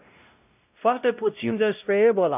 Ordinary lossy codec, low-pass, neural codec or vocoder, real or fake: none; 3.6 kHz; codec, 16 kHz, 0.5 kbps, X-Codec, WavLM features, trained on Multilingual LibriSpeech; fake